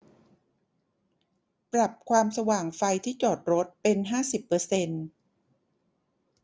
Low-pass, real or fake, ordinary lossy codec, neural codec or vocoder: none; real; none; none